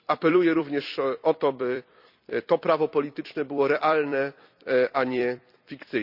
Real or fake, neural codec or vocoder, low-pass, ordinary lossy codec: fake; vocoder, 44.1 kHz, 128 mel bands every 256 samples, BigVGAN v2; 5.4 kHz; none